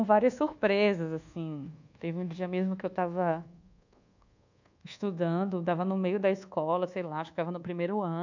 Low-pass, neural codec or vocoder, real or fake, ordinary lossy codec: 7.2 kHz; codec, 24 kHz, 1.2 kbps, DualCodec; fake; none